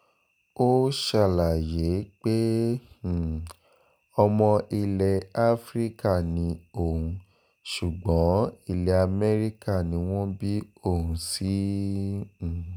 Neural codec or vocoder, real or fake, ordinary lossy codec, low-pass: none; real; none; none